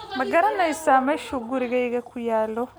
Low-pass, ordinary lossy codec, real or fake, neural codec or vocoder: none; none; real; none